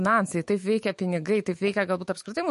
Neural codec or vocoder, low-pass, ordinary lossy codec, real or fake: autoencoder, 48 kHz, 128 numbers a frame, DAC-VAE, trained on Japanese speech; 14.4 kHz; MP3, 48 kbps; fake